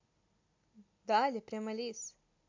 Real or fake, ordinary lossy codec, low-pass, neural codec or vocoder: real; MP3, 48 kbps; 7.2 kHz; none